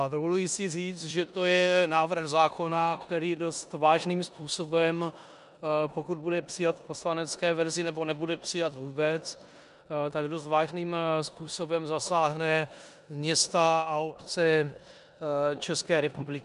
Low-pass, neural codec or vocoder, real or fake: 10.8 kHz; codec, 16 kHz in and 24 kHz out, 0.9 kbps, LongCat-Audio-Codec, four codebook decoder; fake